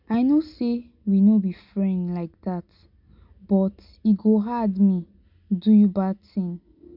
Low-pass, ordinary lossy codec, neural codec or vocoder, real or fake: 5.4 kHz; none; none; real